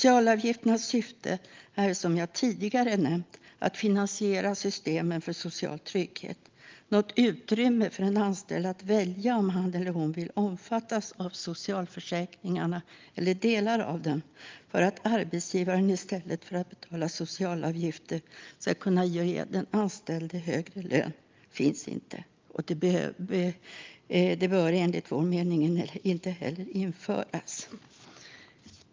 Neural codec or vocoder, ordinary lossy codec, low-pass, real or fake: none; Opus, 24 kbps; 7.2 kHz; real